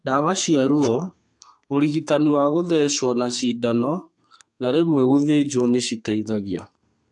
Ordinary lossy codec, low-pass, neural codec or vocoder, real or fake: AAC, 64 kbps; 10.8 kHz; codec, 32 kHz, 1.9 kbps, SNAC; fake